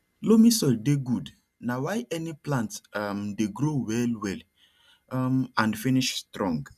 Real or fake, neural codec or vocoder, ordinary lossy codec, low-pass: real; none; none; 14.4 kHz